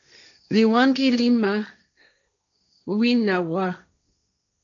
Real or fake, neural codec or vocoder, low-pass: fake; codec, 16 kHz, 1.1 kbps, Voila-Tokenizer; 7.2 kHz